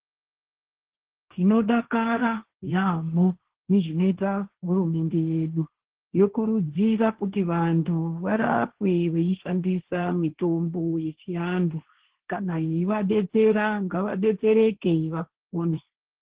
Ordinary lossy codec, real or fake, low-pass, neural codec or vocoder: Opus, 16 kbps; fake; 3.6 kHz; codec, 16 kHz, 1.1 kbps, Voila-Tokenizer